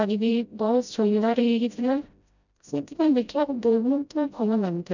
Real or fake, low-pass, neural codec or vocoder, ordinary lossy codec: fake; 7.2 kHz; codec, 16 kHz, 0.5 kbps, FreqCodec, smaller model; none